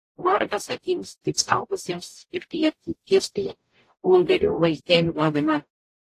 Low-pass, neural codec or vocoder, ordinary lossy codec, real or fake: 14.4 kHz; codec, 44.1 kHz, 0.9 kbps, DAC; AAC, 48 kbps; fake